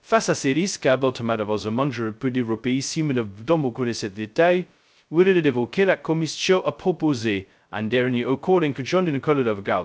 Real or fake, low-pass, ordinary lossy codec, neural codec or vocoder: fake; none; none; codec, 16 kHz, 0.2 kbps, FocalCodec